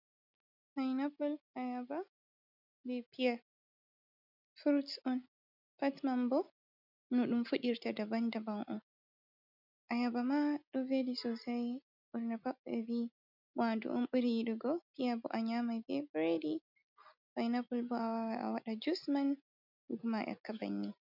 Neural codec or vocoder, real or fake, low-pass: none; real; 5.4 kHz